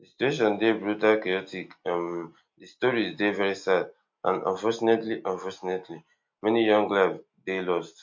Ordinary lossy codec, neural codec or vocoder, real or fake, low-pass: MP3, 48 kbps; none; real; 7.2 kHz